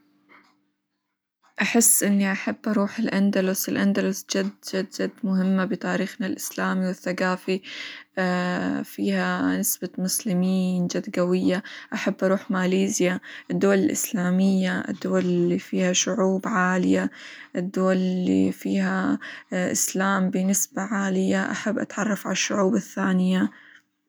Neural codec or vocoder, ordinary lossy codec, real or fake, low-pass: none; none; real; none